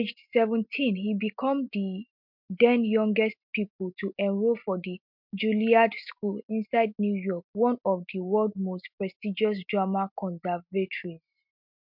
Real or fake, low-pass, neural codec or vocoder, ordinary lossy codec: real; 5.4 kHz; none; MP3, 48 kbps